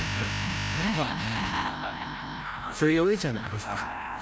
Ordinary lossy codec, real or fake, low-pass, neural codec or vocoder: none; fake; none; codec, 16 kHz, 0.5 kbps, FreqCodec, larger model